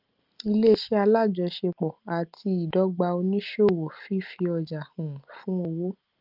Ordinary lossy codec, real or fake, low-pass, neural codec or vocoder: Opus, 24 kbps; real; 5.4 kHz; none